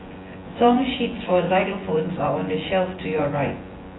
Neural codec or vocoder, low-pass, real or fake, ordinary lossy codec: vocoder, 24 kHz, 100 mel bands, Vocos; 7.2 kHz; fake; AAC, 16 kbps